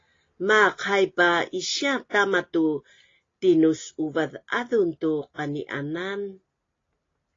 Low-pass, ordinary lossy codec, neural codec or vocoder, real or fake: 7.2 kHz; AAC, 32 kbps; none; real